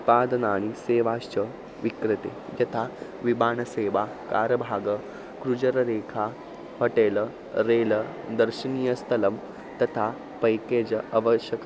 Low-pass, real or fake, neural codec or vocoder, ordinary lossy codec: none; real; none; none